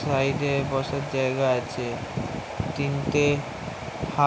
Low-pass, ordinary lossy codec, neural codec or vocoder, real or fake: none; none; none; real